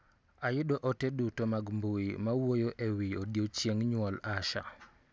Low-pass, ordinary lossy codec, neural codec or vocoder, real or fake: none; none; none; real